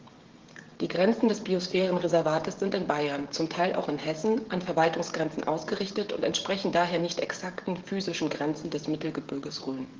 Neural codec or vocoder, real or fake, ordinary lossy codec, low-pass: codec, 16 kHz, 16 kbps, FreqCodec, smaller model; fake; Opus, 16 kbps; 7.2 kHz